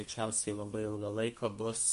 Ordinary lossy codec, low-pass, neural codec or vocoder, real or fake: MP3, 48 kbps; 14.4 kHz; codec, 32 kHz, 1.9 kbps, SNAC; fake